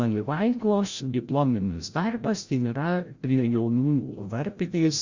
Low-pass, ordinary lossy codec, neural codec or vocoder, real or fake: 7.2 kHz; Opus, 64 kbps; codec, 16 kHz, 0.5 kbps, FreqCodec, larger model; fake